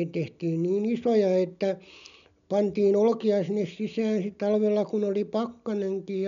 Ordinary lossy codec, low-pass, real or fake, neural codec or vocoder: none; 7.2 kHz; real; none